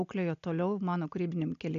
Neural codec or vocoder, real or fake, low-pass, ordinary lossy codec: none; real; 7.2 kHz; MP3, 64 kbps